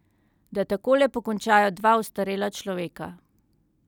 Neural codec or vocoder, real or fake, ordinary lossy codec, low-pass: none; real; none; 19.8 kHz